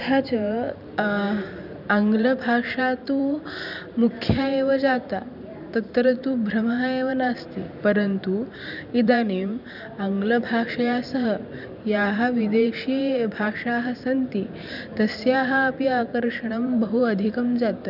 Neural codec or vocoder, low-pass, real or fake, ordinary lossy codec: vocoder, 44.1 kHz, 128 mel bands every 512 samples, BigVGAN v2; 5.4 kHz; fake; none